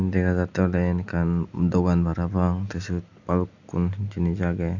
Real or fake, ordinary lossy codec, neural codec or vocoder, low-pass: real; none; none; 7.2 kHz